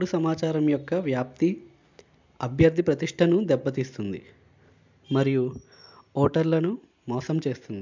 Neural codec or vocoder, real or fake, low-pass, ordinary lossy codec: none; real; 7.2 kHz; MP3, 64 kbps